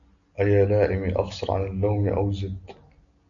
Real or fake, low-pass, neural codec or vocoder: real; 7.2 kHz; none